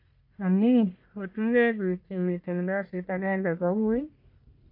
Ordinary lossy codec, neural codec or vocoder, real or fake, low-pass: AAC, 48 kbps; codec, 44.1 kHz, 1.7 kbps, Pupu-Codec; fake; 5.4 kHz